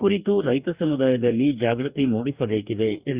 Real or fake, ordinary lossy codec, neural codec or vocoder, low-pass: fake; Opus, 64 kbps; codec, 44.1 kHz, 2.6 kbps, DAC; 3.6 kHz